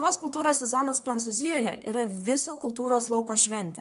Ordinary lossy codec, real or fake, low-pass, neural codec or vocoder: AAC, 96 kbps; fake; 10.8 kHz; codec, 24 kHz, 1 kbps, SNAC